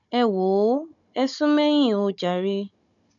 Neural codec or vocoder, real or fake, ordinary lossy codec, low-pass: codec, 16 kHz, 16 kbps, FunCodec, trained on Chinese and English, 50 frames a second; fake; none; 7.2 kHz